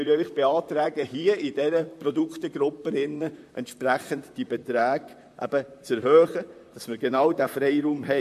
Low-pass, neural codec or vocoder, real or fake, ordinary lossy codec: 14.4 kHz; vocoder, 44.1 kHz, 128 mel bands, Pupu-Vocoder; fake; MP3, 64 kbps